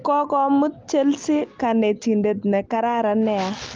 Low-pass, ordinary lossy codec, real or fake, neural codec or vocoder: 7.2 kHz; Opus, 32 kbps; real; none